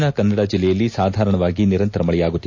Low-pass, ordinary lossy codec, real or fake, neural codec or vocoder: 7.2 kHz; MP3, 48 kbps; real; none